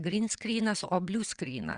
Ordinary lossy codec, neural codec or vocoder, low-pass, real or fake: Opus, 64 kbps; vocoder, 22.05 kHz, 80 mel bands, WaveNeXt; 9.9 kHz; fake